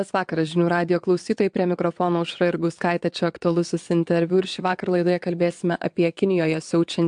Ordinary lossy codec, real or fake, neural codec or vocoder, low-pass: MP3, 64 kbps; fake; vocoder, 22.05 kHz, 80 mel bands, WaveNeXt; 9.9 kHz